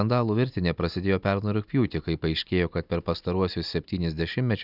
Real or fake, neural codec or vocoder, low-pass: real; none; 5.4 kHz